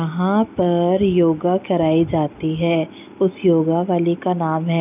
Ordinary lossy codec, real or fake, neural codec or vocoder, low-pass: none; real; none; 3.6 kHz